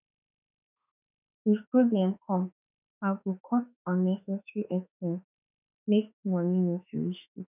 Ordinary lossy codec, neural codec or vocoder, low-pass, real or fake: none; autoencoder, 48 kHz, 32 numbers a frame, DAC-VAE, trained on Japanese speech; 3.6 kHz; fake